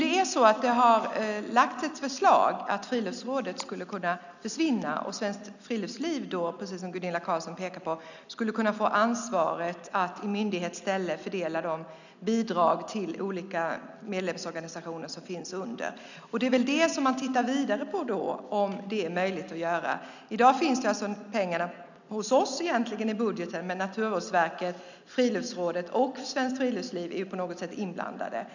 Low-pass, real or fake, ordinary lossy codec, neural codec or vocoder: 7.2 kHz; real; none; none